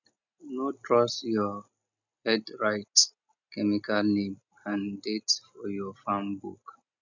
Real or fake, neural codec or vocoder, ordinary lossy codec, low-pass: real; none; none; 7.2 kHz